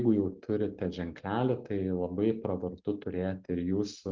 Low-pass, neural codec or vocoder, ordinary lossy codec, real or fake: 7.2 kHz; none; Opus, 32 kbps; real